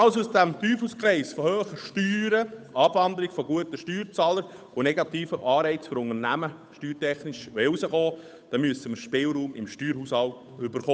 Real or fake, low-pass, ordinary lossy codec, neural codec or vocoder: fake; none; none; codec, 16 kHz, 8 kbps, FunCodec, trained on Chinese and English, 25 frames a second